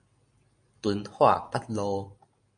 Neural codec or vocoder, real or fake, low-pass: none; real; 9.9 kHz